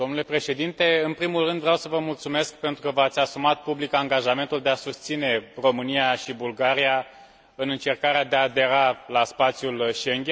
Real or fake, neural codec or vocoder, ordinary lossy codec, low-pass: real; none; none; none